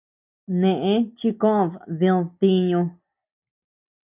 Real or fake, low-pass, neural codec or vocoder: real; 3.6 kHz; none